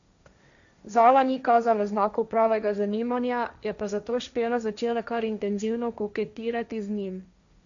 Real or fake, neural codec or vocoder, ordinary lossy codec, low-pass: fake; codec, 16 kHz, 1.1 kbps, Voila-Tokenizer; none; 7.2 kHz